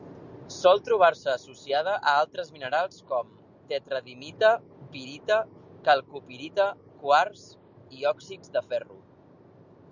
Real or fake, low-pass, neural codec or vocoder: real; 7.2 kHz; none